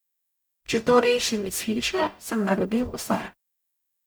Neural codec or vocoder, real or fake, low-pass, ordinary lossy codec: codec, 44.1 kHz, 0.9 kbps, DAC; fake; none; none